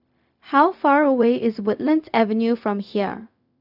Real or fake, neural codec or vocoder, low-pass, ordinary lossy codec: fake; codec, 16 kHz, 0.4 kbps, LongCat-Audio-Codec; 5.4 kHz; none